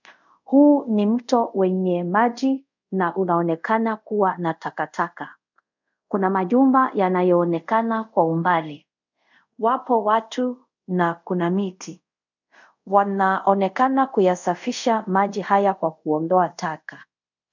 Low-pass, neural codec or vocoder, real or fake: 7.2 kHz; codec, 24 kHz, 0.5 kbps, DualCodec; fake